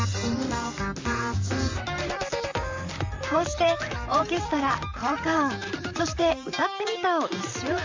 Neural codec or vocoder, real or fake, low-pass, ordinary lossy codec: vocoder, 44.1 kHz, 128 mel bands, Pupu-Vocoder; fake; 7.2 kHz; MP3, 64 kbps